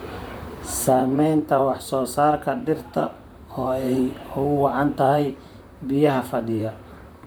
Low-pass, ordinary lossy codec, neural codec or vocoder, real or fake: none; none; vocoder, 44.1 kHz, 128 mel bands, Pupu-Vocoder; fake